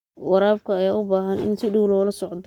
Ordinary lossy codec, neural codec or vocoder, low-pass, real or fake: none; codec, 44.1 kHz, 7.8 kbps, Pupu-Codec; 19.8 kHz; fake